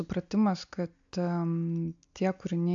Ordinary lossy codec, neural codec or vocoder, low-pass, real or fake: AAC, 64 kbps; none; 7.2 kHz; real